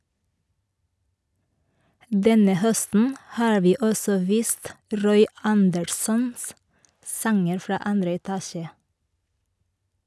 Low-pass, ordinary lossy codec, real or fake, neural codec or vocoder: none; none; real; none